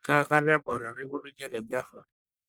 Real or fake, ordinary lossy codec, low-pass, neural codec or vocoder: fake; none; none; codec, 44.1 kHz, 1.7 kbps, Pupu-Codec